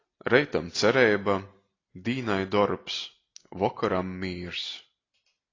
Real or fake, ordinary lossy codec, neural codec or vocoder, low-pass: real; AAC, 32 kbps; none; 7.2 kHz